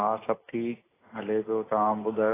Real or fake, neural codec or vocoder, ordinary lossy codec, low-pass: real; none; AAC, 16 kbps; 3.6 kHz